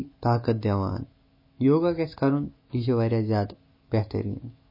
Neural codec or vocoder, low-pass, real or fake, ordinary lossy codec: autoencoder, 48 kHz, 128 numbers a frame, DAC-VAE, trained on Japanese speech; 5.4 kHz; fake; MP3, 24 kbps